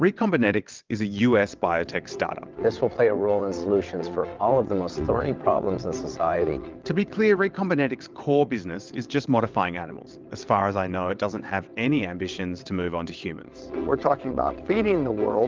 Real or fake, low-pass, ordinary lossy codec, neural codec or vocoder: fake; 7.2 kHz; Opus, 24 kbps; vocoder, 22.05 kHz, 80 mel bands, WaveNeXt